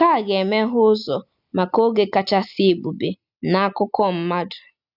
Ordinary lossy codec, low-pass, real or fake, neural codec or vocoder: none; 5.4 kHz; real; none